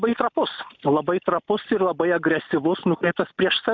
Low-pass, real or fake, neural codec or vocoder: 7.2 kHz; real; none